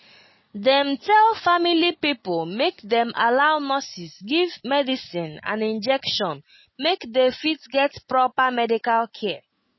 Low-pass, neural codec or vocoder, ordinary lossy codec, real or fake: 7.2 kHz; none; MP3, 24 kbps; real